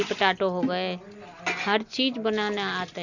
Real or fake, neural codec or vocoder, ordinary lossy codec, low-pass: real; none; none; 7.2 kHz